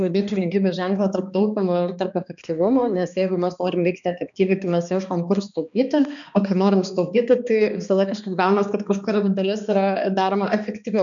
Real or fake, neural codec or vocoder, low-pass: fake; codec, 16 kHz, 2 kbps, X-Codec, HuBERT features, trained on balanced general audio; 7.2 kHz